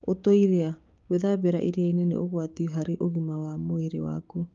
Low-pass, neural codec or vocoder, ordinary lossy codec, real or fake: 7.2 kHz; codec, 16 kHz, 6 kbps, DAC; Opus, 32 kbps; fake